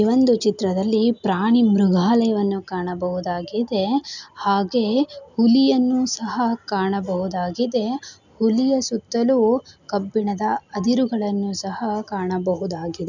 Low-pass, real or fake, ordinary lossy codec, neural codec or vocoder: 7.2 kHz; real; none; none